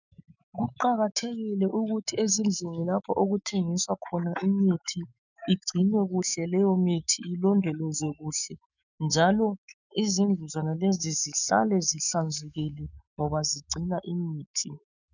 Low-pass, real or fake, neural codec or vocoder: 7.2 kHz; fake; autoencoder, 48 kHz, 128 numbers a frame, DAC-VAE, trained on Japanese speech